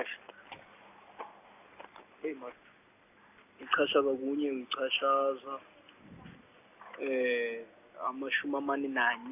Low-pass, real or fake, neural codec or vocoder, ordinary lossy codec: 3.6 kHz; real; none; none